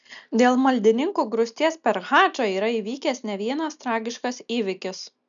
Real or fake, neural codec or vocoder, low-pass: real; none; 7.2 kHz